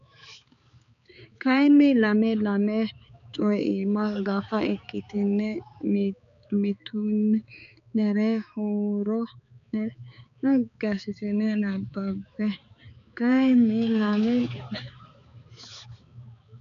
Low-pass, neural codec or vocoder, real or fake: 7.2 kHz; codec, 16 kHz, 4 kbps, X-Codec, HuBERT features, trained on balanced general audio; fake